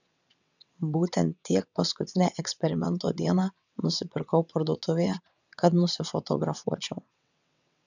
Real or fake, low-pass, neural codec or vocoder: fake; 7.2 kHz; vocoder, 22.05 kHz, 80 mel bands, WaveNeXt